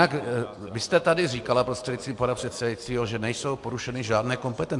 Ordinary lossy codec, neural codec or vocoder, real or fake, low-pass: MP3, 64 kbps; none; real; 10.8 kHz